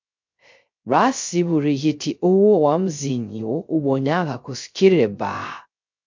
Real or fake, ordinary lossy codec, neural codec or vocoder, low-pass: fake; MP3, 48 kbps; codec, 16 kHz, 0.3 kbps, FocalCodec; 7.2 kHz